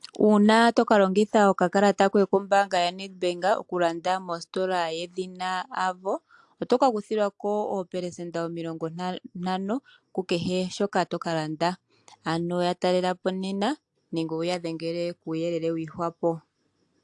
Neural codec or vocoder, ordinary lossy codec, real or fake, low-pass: none; AAC, 64 kbps; real; 10.8 kHz